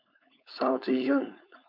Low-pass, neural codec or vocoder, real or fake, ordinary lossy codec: 5.4 kHz; codec, 16 kHz, 4.8 kbps, FACodec; fake; MP3, 48 kbps